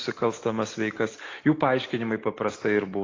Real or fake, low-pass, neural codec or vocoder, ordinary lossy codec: real; 7.2 kHz; none; AAC, 32 kbps